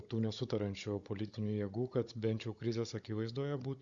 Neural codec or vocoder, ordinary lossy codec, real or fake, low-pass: codec, 16 kHz, 16 kbps, FunCodec, trained on Chinese and English, 50 frames a second; Opus, 64 kbps; fake; 7.2 kHz